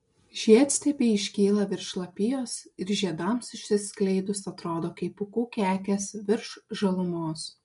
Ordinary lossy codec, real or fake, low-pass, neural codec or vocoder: MP3, 64 kbps; real; 10.8 kHz; none